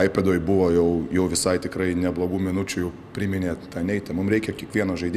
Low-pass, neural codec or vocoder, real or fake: 14.4 kHz; none; real